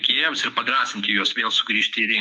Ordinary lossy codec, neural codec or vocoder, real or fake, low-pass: MP3, 96 kbps; autoencoder, 48 kHz, 128 numbers a frame, DAC-VAE, trained on Japanese speech; fake; 10.8 kHz